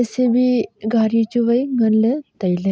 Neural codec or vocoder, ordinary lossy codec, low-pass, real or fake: none; none; none; real